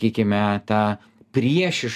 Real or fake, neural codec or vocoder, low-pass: real; none; 14.4 kHz